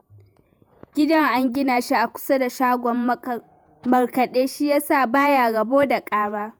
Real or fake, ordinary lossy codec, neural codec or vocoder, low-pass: fake; none; vocoder, 48 kHz, 128 mel bands, Vocos; none